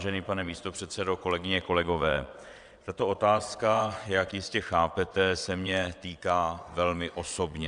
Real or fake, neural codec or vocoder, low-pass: fake; vocoder, 22.05 kHz, 80 mel bands, WaveNeXt; 9.9 kHz